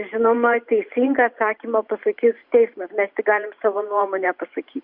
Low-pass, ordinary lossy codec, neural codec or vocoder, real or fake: 5.4 kHz; Opus, 64 kbps; vocoder, 44.1 kHz, 128 mel bands every 512 samples, BigVGAN v2; fake